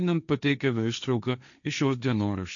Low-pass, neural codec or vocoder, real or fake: 7.2 kHz; codec, 16 kHz, 1.1 kbps, Voila-Tokenizer; fake